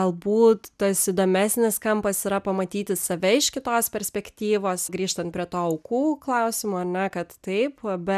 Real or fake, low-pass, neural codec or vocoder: real; 14.4 kHz; none